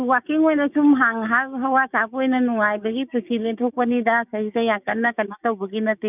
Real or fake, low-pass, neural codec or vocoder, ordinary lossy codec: fake; 3.6 kHz; codec, 16 kHz, 6 kbps, DAC; none